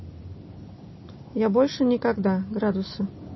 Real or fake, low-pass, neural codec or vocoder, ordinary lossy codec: real; 7.2 kHz; none; MP3, 24 kbps